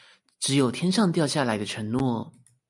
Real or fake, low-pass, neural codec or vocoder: real; 10.8 kHz; none